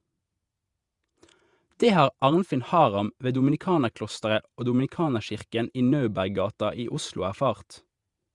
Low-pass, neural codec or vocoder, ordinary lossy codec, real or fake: 10.8 kHz; vocoder, 48 kHz, 128 mel bands, Vocos; Opus, 64 kbps; fake